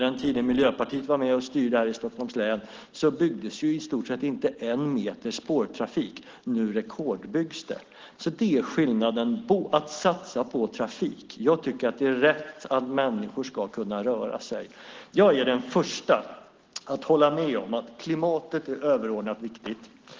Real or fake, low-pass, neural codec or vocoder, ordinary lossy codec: real; 7.2 kHz; none; Opus, 16 kbps